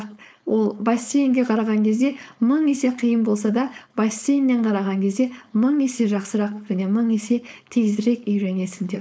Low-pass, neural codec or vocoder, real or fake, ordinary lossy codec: none; codec, 16 kHz, 4.8 kbps, FACodec; fake; none